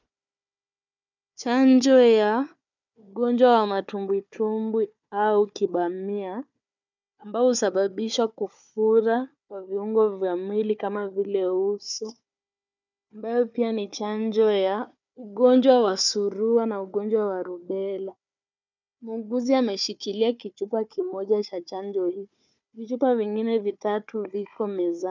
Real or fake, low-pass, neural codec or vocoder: fake; 7.2 kHz; codec, 16 kHz, 4 kbps, FunCodec, trained on Chinese and English, 50 frames a second